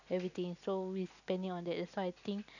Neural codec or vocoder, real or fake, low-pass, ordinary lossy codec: none; real; 7.2 kHz; none